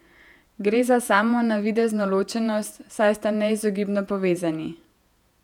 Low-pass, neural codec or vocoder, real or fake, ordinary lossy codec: 19.8 kHz; vocoder, 48 kHz, 128 mel bands, Vocos; fake; none